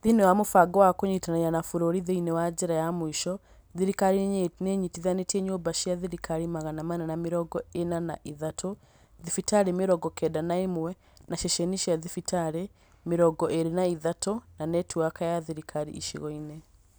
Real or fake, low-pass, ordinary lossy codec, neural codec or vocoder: real; none; none; none